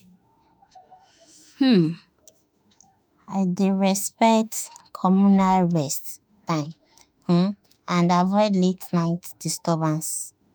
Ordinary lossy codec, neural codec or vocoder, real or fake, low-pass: none; autoencoder, 48 kHz, 32 numbers a frame, DAC-VAE, trained on Japanese speech; fake; none